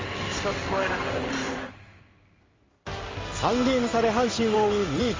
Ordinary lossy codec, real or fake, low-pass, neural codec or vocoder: Opus, 32 kbps; fake; 7.2 kHz; codec, 16 kHz in and 24 kHz out, 1 kbps, XY-Tokenizer